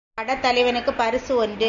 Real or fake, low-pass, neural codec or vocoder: real; 7.2 kHz; none